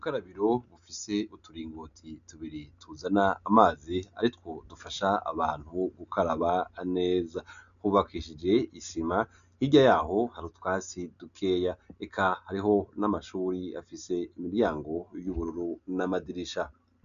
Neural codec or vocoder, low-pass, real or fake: none; 7.2 kHz; real